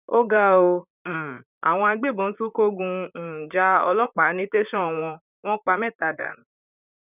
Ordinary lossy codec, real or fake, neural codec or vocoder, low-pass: none; fake; vocoder, 44.1 kHz, 128 mel bands, Pupu-Vocoder; 3.6 kHz